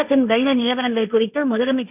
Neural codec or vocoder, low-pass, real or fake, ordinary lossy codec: codec, 16 kHz, 1.1 kbps, Voila-Tokenizer; 3.6 kHz; fake; none